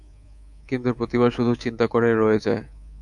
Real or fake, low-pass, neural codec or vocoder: fake; 10.8 kHz; codec, 24 kHz, 3.1 kbps, DualCodec